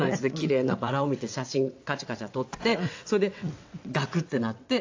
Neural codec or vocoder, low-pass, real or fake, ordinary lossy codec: vocoder, 22.05 kHz, 80 mel bands, WaveNeXt; 7.2 kHz; fake; AAC, 48 kbps